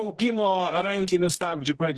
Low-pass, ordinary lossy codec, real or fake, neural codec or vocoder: 10.8 kHz; Opus, 16 kbps; fake; codec, 24 kHz, 0.9 kbps, WavTokenizer, medium music audio release